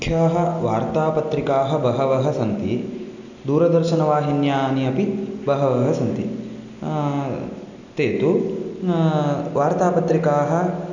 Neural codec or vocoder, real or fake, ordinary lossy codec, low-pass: none; real; none; 7.2 kHz